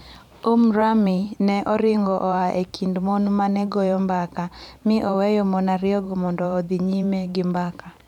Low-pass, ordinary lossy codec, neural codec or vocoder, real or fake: 19.8 kHz; none; vocoder, 44.1 kHz, 128 mel bands every 512 samples, BigVGAN v2; fake